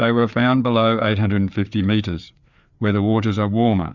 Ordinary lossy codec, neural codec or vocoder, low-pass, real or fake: Opus, 64 kbps; codec, 16 kHz, 4 kbps, FreqCodec, larger model; 7.2 kHz; fake